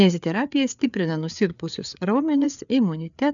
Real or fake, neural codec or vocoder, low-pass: fake; codec, 16 kHz, 4 kbps, FreqCodec, larger model; 7.2 kHz